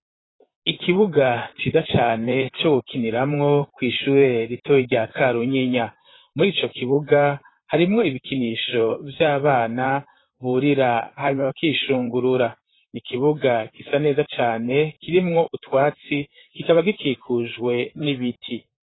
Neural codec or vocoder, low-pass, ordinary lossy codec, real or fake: vocoder, 44.1 kHz, 128 mel bands, Pupu-Vocoder; 7.2 kHz; AAC, 16 kbps; fake